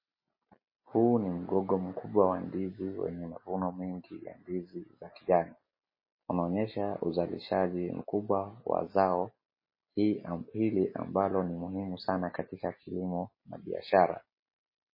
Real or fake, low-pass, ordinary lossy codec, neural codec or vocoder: real; 5.4 kHz; MP3, 24 kbps; none